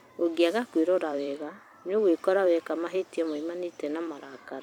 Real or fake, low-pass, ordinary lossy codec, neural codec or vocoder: fake; 19.8 kHz; none; vocoder, 44.1 kHz, 128 mel bands every 256 samples, BigVGAN v2